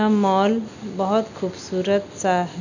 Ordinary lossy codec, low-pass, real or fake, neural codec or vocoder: none; 7.2 kHz; real; none